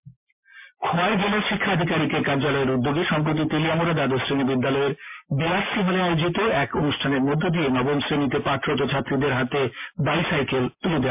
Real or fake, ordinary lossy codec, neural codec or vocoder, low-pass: real; MP3, 32 kbps; none; 3.6 kHz